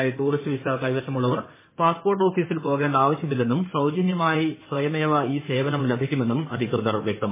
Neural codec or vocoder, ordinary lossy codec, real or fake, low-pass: codec, 16 kHz in and 24 kHz out, 2.2 kbps, FireRedTTS-2 codec; MP3, 16 kbps; fake; 3.6 kHz